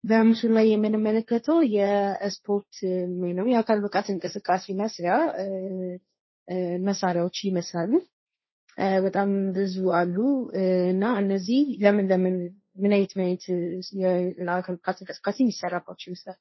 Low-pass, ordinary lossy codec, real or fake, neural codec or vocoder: 7.2 kHz; MP3, 24 kbps; fake; codec, 16 kHz, 1.1 kbps, Voila-Tokenizer